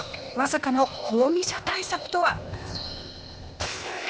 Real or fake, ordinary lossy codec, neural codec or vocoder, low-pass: fake; none; codec, 16 kHz, 0.8 kbps, ZipCodec; none